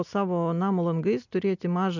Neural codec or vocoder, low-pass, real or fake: none; 7.2 kHz; real